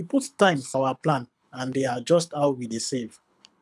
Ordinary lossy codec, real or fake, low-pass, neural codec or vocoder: none; fake; none; codec, 24 kHz, 6 kbps, HILCodec